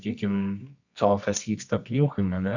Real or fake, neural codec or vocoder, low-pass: fake; codec, 24 kHz, 0.9 kbps, WavTokenizer, medium music audio release; 7.2 kHz